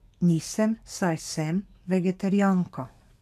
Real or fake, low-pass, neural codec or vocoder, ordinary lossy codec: fake; 14.4 kHz; codec, 44.1 kHz, 7.8 kbps, DAC; none